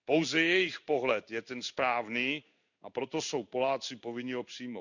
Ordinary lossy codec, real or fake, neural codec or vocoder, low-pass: none; fake; codec, 16 kHz in and 24 kHz out, 1 kbps, XY-Tokenizer; 7.2 kHz